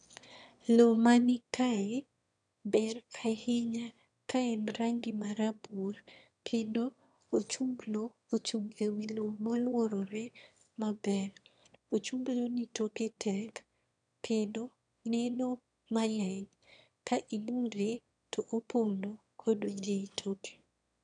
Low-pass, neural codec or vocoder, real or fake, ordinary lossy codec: 9.9 kHz; autoencoder, 22.05 kHz, a latent of 192 numbers a frame, VITS, trained on one speaker; fake; none